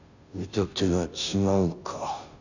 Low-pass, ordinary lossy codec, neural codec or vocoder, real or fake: 7.2 kHz; none; codec, 16 kHz, 0.5 kbps, FunCodec, trained on Chinese and English, 25 frames a second; fake